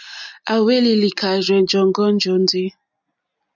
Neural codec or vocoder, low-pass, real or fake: none; 7.2 kHz; real